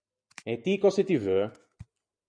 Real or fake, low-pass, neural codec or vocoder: real; 9.9 kHz; none